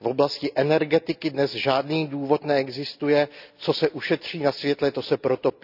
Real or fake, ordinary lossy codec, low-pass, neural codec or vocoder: real; none; 5.4 kHz; none